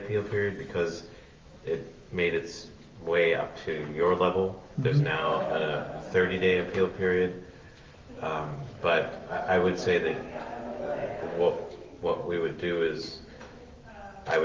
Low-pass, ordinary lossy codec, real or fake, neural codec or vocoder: 7.2 kHz; Opus, 32 kbps; fake; autoencoder, 48 kHz, 128 numbers a frame, DAC-VAE, trained on Japanese speech